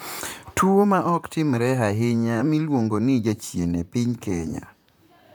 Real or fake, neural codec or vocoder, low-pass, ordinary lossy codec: fake; vocoder, 44.1 kHz, 128 mel bands, Pupu-Vocoder; none; none